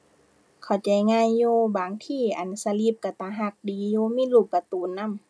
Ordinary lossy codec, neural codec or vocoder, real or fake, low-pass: none; none; real; none